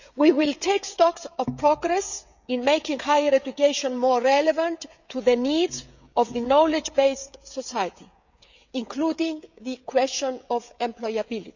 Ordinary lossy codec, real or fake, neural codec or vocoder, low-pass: none; fake; codec, 16 kHz, 16 kbps, FreqCodec, smaller model; 7.2 kHz